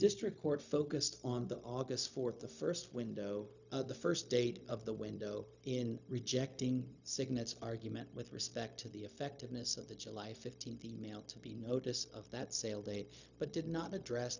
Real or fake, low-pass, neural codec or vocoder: fake; 7.2 kHz; codec, 16 kHz, 0.4 kbps, LongCat-Audio-Codec